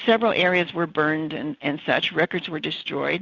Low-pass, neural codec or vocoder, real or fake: 7.2 kHz; none; real